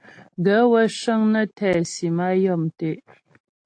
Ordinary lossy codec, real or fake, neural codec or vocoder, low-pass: AAC, 48 kbps; real; none; 9.9 kHz